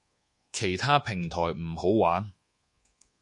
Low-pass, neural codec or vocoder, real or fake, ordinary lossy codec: 10.8 kHz; codec, 24 kHz, 1.2 kbps, DualCodec; fake; MP3, 48 kbps